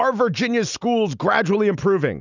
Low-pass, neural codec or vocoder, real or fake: 7.2 kHz; none; real